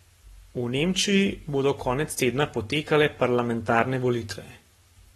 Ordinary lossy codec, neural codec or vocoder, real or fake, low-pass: AAC, 32 kbps; codec, 44.1 kHz, 7.8 kbps, Pupu-Codec; fake; 19.8 kHz